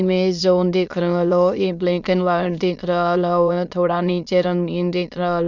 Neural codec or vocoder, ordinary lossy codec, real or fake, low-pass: autoencoder, 22.05 kHz, a latent of 192 numbers a frame, VITS, trained on many speakers; none; fake; 7.2 kHz